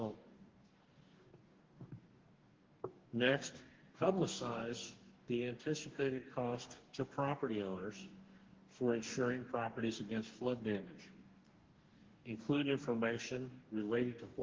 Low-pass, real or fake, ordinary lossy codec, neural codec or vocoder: 7.2 kHz; fake; Opus, 16 kbps; codec, 44.1 kHz, 2.6 kbps, DAC